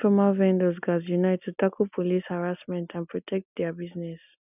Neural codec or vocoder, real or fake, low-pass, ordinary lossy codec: none; real; 3.6 kHz; none